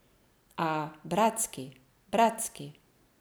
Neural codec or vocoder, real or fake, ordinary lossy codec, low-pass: none; real; none; none